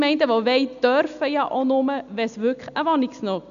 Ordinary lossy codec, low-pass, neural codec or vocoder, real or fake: none; 7.2 kHz; none; real